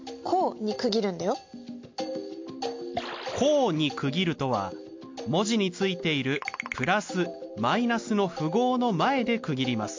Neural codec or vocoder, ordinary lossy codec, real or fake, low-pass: none; MP3, 48 kbps; real; 7.2 kHz